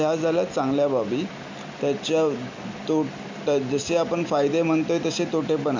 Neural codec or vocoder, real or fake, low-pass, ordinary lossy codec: none; real; 7.2 kHz; MP3, 48 kbps